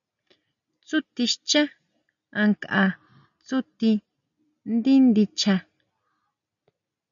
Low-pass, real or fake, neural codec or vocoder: 7.2 kHz; real; none